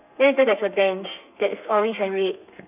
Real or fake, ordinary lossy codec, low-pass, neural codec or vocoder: fake; none; 3.6 kHz; codec, 32 kHz, 1.9 kbps, SNAC